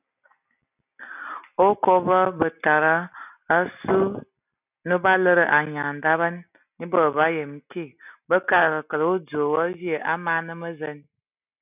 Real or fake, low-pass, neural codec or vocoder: real; 3.6 kHz; none